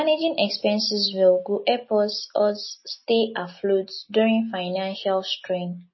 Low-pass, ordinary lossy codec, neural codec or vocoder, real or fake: 7.2 kHz; MP3, 24 kbps; none; real